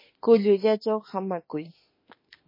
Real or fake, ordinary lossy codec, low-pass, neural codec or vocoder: fake; MP3, 24 kbps; 5.4 kHz; autoencoder, 48 kHz, 32 numbers a frame, DAC-VAE, trained on Japanese speech